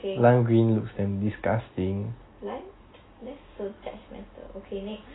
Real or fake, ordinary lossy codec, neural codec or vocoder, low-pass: real; AAC, 16 kbps; none; 7.2 kHz